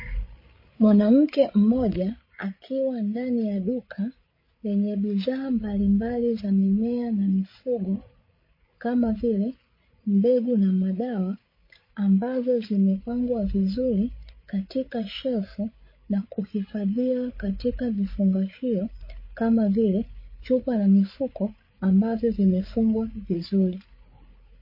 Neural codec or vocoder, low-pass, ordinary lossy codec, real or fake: codec, 16 kHz, 8 kbps, FreqCodec, larger model; 5.4 kHz; MP3, 24 kbps; fake